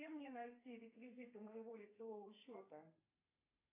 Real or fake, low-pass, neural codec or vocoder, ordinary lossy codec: fake; 3.6 kHz; codec, 32 kHz, 1.9 kbps, SNAC; MP3, 24 kbps